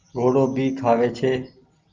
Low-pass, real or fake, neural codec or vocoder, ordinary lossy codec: 7.2 kHz; real; none; Opus, 24 kbps